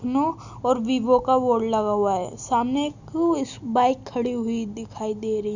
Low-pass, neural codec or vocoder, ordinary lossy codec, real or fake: 7.2 kHz; none; none; real